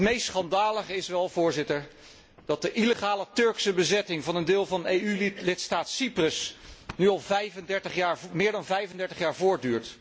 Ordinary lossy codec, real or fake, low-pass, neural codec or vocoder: none; real; none; none